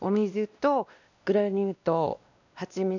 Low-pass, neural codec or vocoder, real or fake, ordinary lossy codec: 7.2 kHz; codec, 16 kHz, 1 kbps, X-Codec, WavLM features, trained on Multilingual LibriSpeech; fake; none